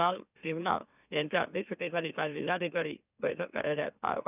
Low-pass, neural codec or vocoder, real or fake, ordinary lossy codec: 3.6 kHz; autoencoder, 44.1 kHz, a latent of 192 numbers a frame, MeloTTS; fake; none